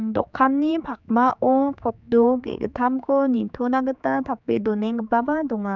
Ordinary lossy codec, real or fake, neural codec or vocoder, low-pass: none; fake; codec, 16 kHz, 4 kbps, X-Codec, HuBERT features, trained on general audio; 7.2 kHz